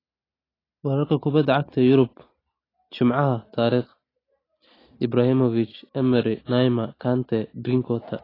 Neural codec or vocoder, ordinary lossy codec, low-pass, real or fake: none; AAC, 24 kbps; 5.4 kHz; real